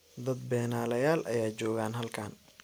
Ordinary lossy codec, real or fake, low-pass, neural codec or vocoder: none; real; none; none